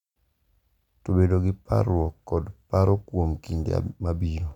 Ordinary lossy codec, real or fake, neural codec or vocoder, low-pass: none; real; none; 19.8 kHz